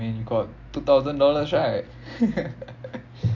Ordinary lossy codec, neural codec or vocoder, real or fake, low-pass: MP3, 64 kbps; none; real; 7.2 kHz